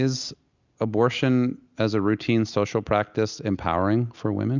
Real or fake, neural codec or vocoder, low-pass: real; none; 7.2 kHz